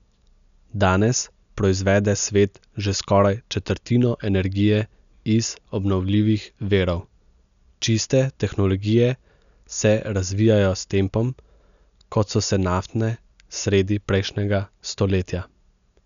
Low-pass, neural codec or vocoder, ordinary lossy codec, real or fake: 7.2 kHz; none; none; real